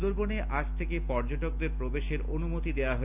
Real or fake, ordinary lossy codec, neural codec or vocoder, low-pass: real; none; none; 3.6 kHz